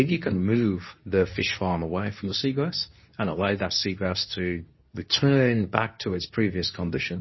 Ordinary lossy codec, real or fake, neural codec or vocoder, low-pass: MP3, 24 kbps; fake; codec, 24 kHz, 0.9 kbps, WavTokenizer, medium speech release version 2; 7.2 kHz